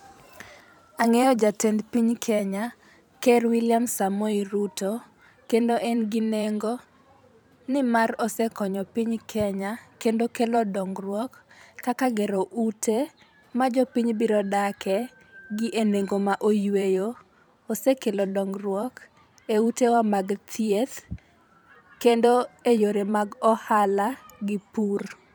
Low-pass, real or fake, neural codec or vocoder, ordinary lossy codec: none; fake; vocoder, 44.1 kHz, 128 mel bands every 512 samples, BigVGAN v2; none